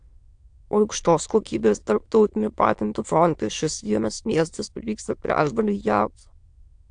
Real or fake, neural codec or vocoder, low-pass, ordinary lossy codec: fake; autoencoder, 22.05 kHz, a latent of 192 numbers a frame, VITS, trained on many speakers; 9.9 kHz; AAC, 64 kbps